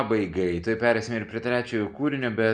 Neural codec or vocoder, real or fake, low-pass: none; real; 9.9 kHz